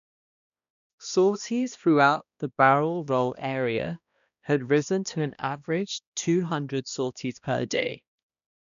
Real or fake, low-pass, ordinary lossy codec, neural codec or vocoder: fake; 7.2 kHz; none; codec, 16 kHz, 1 kbps, X-Codec, HuBERT features, trained on balanced general audio